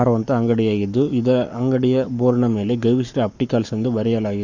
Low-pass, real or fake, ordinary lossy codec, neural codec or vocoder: 7.2 kHz; fake; none; codec, 44.1 kHz, 7.8 kbps, Pupu-Codec